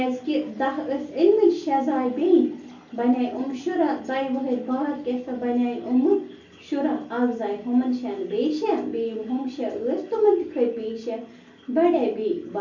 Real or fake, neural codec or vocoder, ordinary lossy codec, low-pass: real; none; none; 7.2 kHz